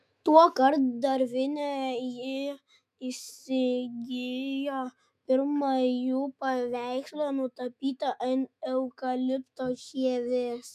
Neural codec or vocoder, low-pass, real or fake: autoencoder, 48 kHz, 128 numbers a frame, DAC-VAE, trained on Japanese speech; 14.4 kHz; fake